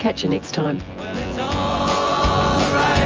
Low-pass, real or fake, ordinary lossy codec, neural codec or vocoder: 7.2 kHz; fake; Opus, 24 kbps; vocoder, 24 kHz, 100 mel bands, Vocos